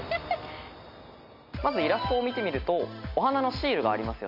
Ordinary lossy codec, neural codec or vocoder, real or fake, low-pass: MP3, 48 kbps; none; real; 5.4 kHz